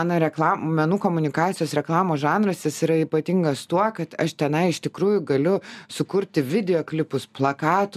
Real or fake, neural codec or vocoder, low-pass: real; none; 14.4 kHz